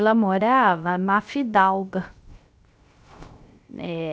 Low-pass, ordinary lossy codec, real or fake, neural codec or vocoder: none; none; fake; codec, 16 kHz, 0.3 kbps, FocalCodec